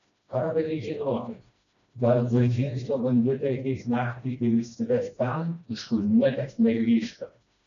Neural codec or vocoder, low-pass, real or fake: codec, 16 kHz, 1 kbps, FreqCodec, smaller model; 7.2 kHz; fake